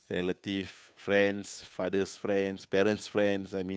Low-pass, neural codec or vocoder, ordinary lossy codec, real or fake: none; codec, 16 kHz, 2 kbps, FunCodec, trained on Chinese and English, 25 frames a second; none; fake